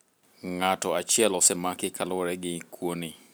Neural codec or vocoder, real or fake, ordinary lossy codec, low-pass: none; real; none; none